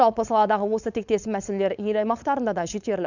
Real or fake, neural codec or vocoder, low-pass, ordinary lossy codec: fake; codec, 16 kHz, 4.8 kbps, FACodec; 7.2 kHz; none